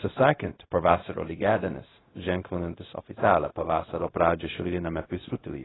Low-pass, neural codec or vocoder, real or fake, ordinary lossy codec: 7.2 kHz; codec, 16 kHz, 0.4 kbps, LongCat-Audio-Codec; fake; AAC, 16 kbps